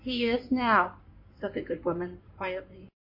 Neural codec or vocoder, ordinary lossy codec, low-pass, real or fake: codec, 16 kHz in and 24 kHz out, 2.2 kbps, FireRedTTS-2 codec; MP3, 32 kbps; 5.4 kHz; fake